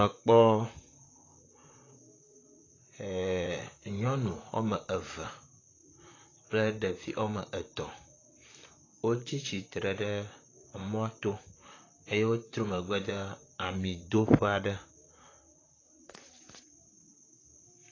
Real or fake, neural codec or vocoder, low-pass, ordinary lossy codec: fake; vocoder, 44.1 kHz, 128 mel bands, Pupu-Vocoder; 7.2 kHz; AAC, 32 kbps